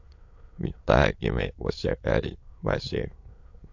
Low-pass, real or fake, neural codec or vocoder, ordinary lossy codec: 7.2 kHz; fake; autoencoder, 22.05 kHz, a latent of 192 numbers a frame, VITS, trained on many speakers; AAC, 48 kbps